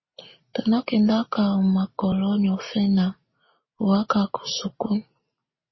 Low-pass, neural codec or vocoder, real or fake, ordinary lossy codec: 7.2 kHz; none; real; MP3, 24 kbps